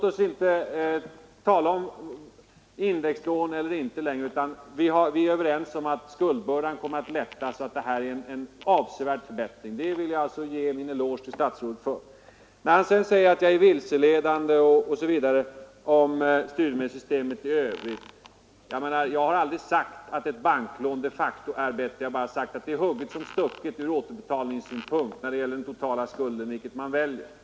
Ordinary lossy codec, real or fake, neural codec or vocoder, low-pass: none; real; none; none